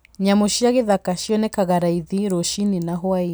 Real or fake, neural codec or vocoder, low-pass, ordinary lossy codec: real; none; none; none